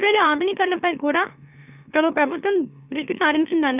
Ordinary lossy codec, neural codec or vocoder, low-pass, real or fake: none; autoencoder, 44.1 kHz, a latent of 192 numbers a frame, MeloTTS; 3.6 kHz; fake